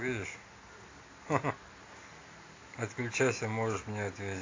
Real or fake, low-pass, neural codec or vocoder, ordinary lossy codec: real; 7.2 kHz; none; AAC, 32 kbps